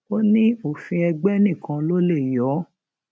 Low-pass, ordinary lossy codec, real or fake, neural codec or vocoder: none; none; real; none